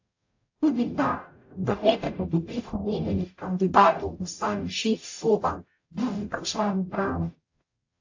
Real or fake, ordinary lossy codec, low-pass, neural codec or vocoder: fake; AAC, 48 kbps; 7.2 kHz; codec, 44.1 kHz, 0.9 kbps, DAC